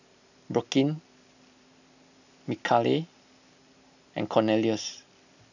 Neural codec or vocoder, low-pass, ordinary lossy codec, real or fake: none; 7.2 kHz; none; real